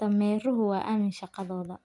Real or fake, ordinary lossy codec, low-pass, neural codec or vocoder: real; MP3, 96 kbps; 10.8 kHz; none